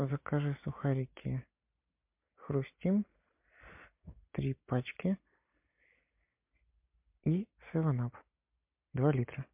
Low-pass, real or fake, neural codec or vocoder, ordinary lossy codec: 3.6 kHz; real; none; MP3, 32 kbps